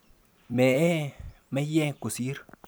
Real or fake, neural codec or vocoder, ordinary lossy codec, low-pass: fake; vocoder, 44.1 kHz, 128 mel bands every 256 samples, BigVGAN v2; none; none